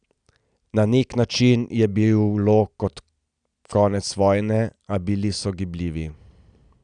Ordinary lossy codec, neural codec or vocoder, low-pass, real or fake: none; none; 9.9 kHz; real